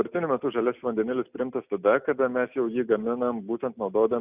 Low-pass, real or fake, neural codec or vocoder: 3.6 kHz; real; none